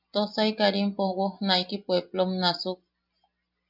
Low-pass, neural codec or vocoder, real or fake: 5.4 kHz; none; real